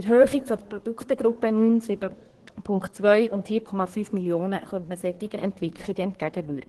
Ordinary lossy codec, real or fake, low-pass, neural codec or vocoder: Opus, 16 kbps; fake; 10.8 kHz; codec, 24 kHz, 1 kbps, SNAC